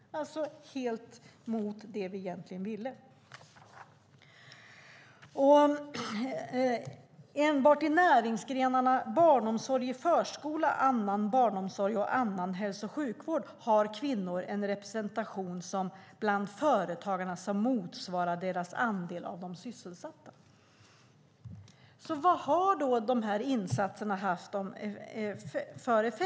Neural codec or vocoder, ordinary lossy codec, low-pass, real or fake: none; none; none; real